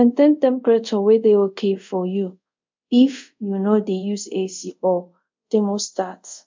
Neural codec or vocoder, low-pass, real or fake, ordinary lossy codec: codec, 24 kHz, 0.5 kbps, DualCodec; 7.2 kHz; fake; MP3, 64 kbps